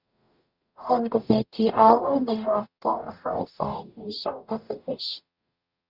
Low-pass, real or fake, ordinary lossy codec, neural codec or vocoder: 5.4 kHz; fake; Opus, 64 kbps; codec, 44.1 kHz, 0.9 kbps, DAC